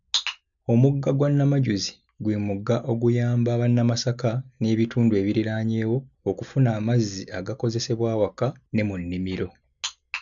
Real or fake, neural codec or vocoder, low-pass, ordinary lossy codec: real; none; 7.2 kHz; none